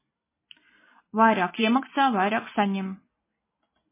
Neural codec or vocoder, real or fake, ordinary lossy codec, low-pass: none; real; MP3, 16 kbps; 3.6 kHz